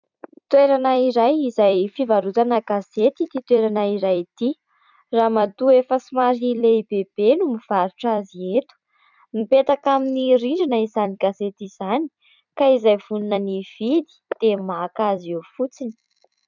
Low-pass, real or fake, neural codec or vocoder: 7.2 kHz; fake; vocoder, 44.1 kHz, 128 mel bands every 256 samples, BigVGAN v2